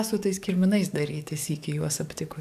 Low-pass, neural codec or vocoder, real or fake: 14.4 kHz; codec, 44.1 kHz, 7.8 kbps, DAC; fake